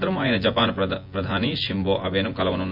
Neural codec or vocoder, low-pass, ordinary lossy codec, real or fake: vocoder, 24 kHz, 100 mel bands, Vocos; 5.4 kHz; MP3, 48 kbps; fake